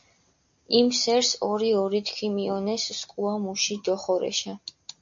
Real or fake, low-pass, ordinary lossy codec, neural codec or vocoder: real; 7.2 kHz; AAC, 64 kbps; none